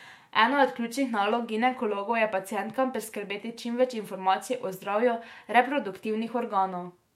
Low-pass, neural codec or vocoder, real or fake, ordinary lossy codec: 19.8 kHz; autoencoder, 48 kHz, 128 numbers a frame, DAC-VAE, trained on Japanese speech; fake; MP3, 64 kbps